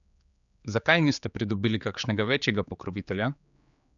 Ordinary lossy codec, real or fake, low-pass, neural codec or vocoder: none; fake; 7.2 kHz; codec, 16 kHz, 4 kbps, X-Codec, HuBERT features, trained on general audio